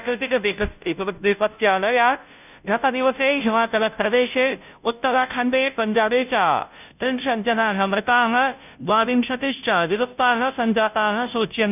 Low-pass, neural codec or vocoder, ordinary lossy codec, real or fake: 3.6 kHz; codec, 16 kHz, 0.5 kbps, FunCodec, trained on Chinese and English, 25 frames a second; none; fake